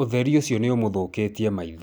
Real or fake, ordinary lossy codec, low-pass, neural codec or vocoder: real; none; none; none